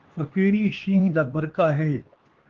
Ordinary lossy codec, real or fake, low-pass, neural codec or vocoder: Opus, 16 kbps; fake; 7.2 kHz; codec, 16 kHz, 2 kbps, X-Codec, HuBERT features, trained on LibriSpeech